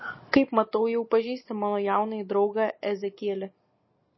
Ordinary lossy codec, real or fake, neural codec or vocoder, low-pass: MP3, 24 kbps; real; none; 7.2 kHz